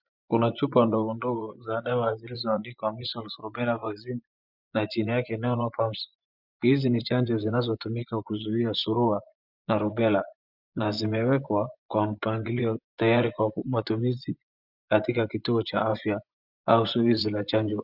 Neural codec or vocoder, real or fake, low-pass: vocoder, 24 kHz, 100 mel bands, Vocos; fake; 5.4 kHz